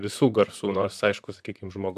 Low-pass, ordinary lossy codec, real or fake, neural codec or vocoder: 14.4 kHz; MP3, 96 kbps; fake; vocoder, 44.1 kHz, 128 mel bands, Pupu-Vocoder